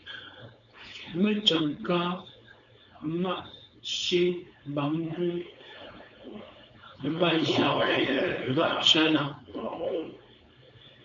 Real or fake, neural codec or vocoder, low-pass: fake; codec, 16 kHz, 4.8 kbps, FACodec; 7.2 kHz